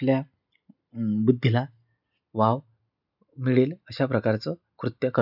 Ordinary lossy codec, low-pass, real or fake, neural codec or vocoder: none; 5.4 kHz; real; none